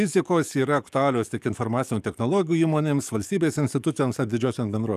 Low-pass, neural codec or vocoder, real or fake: 14.4 kHz; codec, 44.1 kHz, 7.8 kbps, DAC; fake